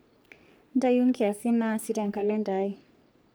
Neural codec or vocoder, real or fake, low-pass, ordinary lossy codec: codec, 44.1 kHz, 3.4 kbps, Pupu-Codec; fake; none; none